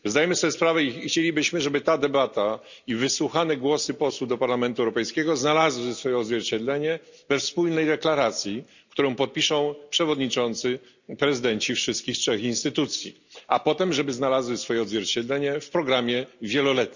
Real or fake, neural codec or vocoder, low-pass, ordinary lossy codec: real; none; 7.2 kHz; none